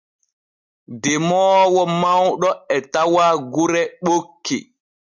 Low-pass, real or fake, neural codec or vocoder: 7.2 kHz; real; none